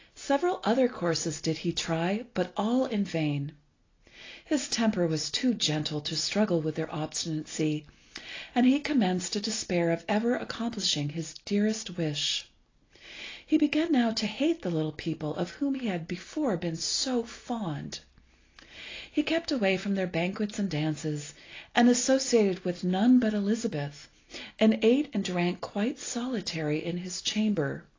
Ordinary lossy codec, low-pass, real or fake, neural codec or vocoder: AAC, 32 kbps; 7.2 kHz; real; none